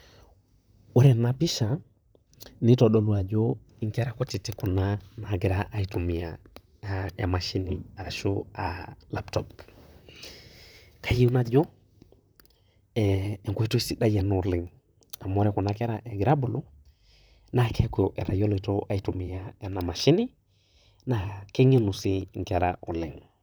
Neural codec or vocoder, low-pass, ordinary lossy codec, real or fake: vocoder, 44.1 kHz, 128 mel bands, Pupu-Vocoder; none; none; fake